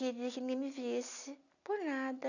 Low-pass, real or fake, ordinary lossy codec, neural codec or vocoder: 7.2 kHz; real; none; none